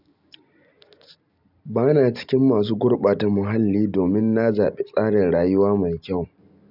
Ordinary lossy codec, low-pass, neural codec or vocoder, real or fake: none; 5.4 kHz; none; real